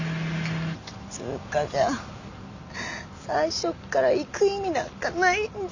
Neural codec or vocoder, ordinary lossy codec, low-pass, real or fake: none; none; 7.2 kHz; real